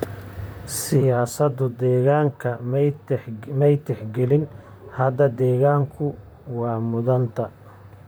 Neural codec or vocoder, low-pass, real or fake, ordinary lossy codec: vocoder, 44.1 kHz, 128 mel bands, Pupu-Vocoder; none; fake; none